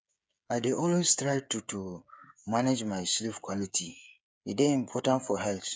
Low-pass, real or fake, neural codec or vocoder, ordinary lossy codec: none; fake; codec, 16 kHz, 16 kbps, FreqCodec, smaller model; none